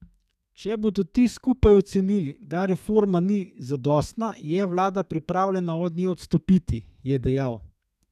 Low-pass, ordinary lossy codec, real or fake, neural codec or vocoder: 14.4 kHz; none; fake; codec, 32 kHz, 1.9 kbps, SNAC